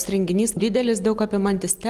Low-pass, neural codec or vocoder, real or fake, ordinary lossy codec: 14.4 kHz; none; real; Opus, 32 kbps